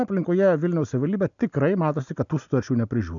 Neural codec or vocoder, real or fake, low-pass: none; real; 7.2 kHz